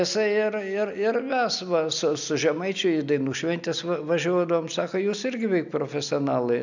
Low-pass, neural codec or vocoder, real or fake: 7.2 kHz; none; real